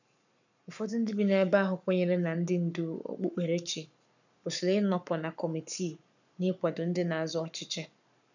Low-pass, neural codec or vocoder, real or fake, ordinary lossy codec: 7.2 kHz; codec, 44.1 kHz, 7.8 kbps, Pupu-Codec; fake; none